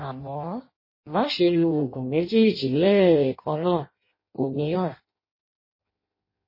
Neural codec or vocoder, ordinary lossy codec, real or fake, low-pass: codec, 16 kHz in and 24 kHz out, 0.6 kbps, FireRedTTS-2 codec; MP3, 24 kbps; fake; 5.4 kHz